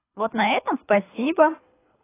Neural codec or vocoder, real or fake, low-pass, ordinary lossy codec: codec, 24 kHz, 3 kbps, HILCodec; fake; 3.6 kHz; AAC, 16 kbps